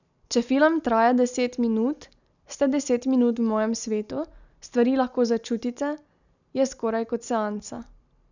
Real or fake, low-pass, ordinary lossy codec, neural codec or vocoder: real; 7.2 kHz; none; none